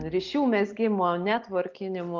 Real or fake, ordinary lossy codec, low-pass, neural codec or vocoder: real; Opus, 32 kbps; 7.2 kHz; none